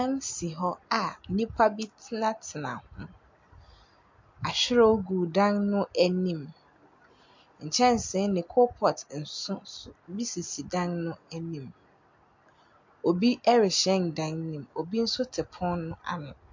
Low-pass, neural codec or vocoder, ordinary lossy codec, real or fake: 7.2 kHz; none; MP3, 48 kbps; real